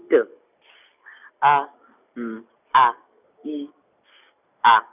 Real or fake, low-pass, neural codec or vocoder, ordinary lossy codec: real; 3.6 kHz; none; none